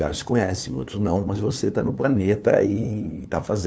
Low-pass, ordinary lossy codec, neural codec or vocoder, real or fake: none; none; codec, 16 kHz, 2 kbps, FunCodec, trained on LibriTTS, 25 frames a second; fake